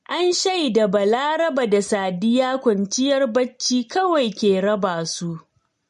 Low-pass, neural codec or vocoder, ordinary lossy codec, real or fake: 14.4 kHz; none; MP3, 48 kbps; real